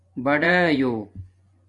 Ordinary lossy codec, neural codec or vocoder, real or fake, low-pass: MP3, 96 kbps; vocoder, 24 kHz, 100 mel bands, Vocos; fake; 10.8 kHz